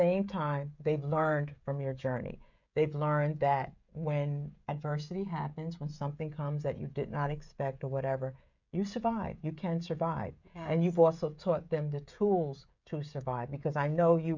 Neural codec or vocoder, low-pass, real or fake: codec, 16 kHz, 16 kbps, FreqCodec, smaller model; 7.2 kHz; fake